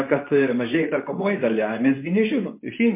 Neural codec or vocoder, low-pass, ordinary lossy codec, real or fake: codec, 24 kHz, 0.9 kbps, WavTokenizer, medium speech release version 2; 3.6 kHz; MP3, 24 kbps; fake